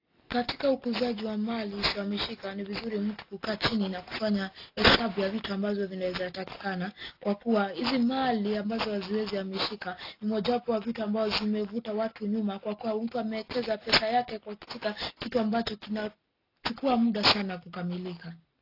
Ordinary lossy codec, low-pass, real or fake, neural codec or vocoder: AAC, 32 kbps; 5.4 kHz; real; none